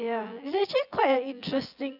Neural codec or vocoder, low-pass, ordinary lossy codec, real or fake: vocoder, 24 kHz, 100 mel bands, Vocos; 5.4 kHz; none; fake